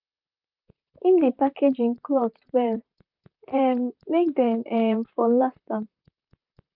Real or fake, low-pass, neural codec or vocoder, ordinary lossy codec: fake; 5.4 kHz; vocoder, 44.1 kHz, 128 mel bands, Pupu-Vocoder; none